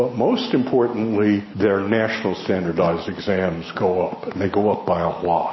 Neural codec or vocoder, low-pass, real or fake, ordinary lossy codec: none; 7.2 kHz; real; MP3, 24 kbps